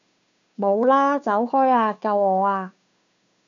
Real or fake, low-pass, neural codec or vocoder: fake; 7.2 kHz; codec, 16 kHz, 2 kbps, FunCodec, trained on Chinese and English, 25 frames a second